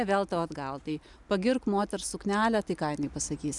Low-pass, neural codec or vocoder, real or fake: 10.8 kHz; none; real